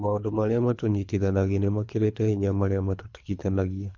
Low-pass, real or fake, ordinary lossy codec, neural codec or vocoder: 7.2 kHz; fake; none; codec, 24 kHz, 3 kbps, HILCodec